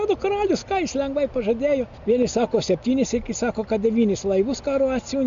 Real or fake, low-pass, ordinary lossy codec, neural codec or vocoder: real; 7.2 kHz; MP3, 64 kbps; none